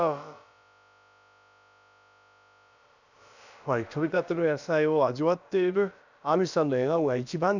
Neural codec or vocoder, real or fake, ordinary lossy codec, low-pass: codec, 16 kHz, about 1 kbps, DyCAST, with the encoder's durations; fake; none; 7.2 kHz